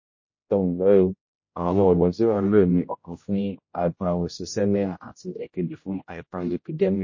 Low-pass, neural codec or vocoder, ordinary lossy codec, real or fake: 7.2 kHz; codec, 16 kHz, 0.5 kbps, X-Codec, HuBERT features, trained on general audio; MP3, 32 kbps; fake